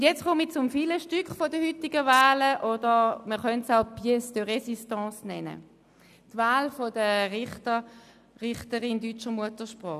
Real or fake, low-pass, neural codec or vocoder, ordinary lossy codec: real; 14.4 kHz; none; none